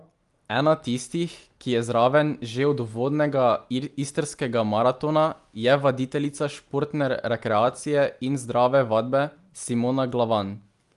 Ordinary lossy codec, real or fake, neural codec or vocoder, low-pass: Opus, 32 kbps; real; none; 10.8 kHz